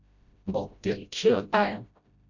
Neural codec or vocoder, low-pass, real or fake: codec, 16 kHz, 0.5 kbps, FreqCodec, smaller model; 7.2 kHz; fake